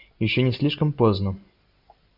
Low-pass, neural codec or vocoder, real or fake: 5.4 kHz; none; real